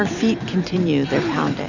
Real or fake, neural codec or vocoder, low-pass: fake; vocoder, 44.1 kHz, 128 mel bands every 256 samples, BigVGAN v2; 7.2 kHz